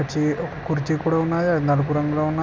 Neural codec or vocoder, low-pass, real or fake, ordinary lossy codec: none; none; real; none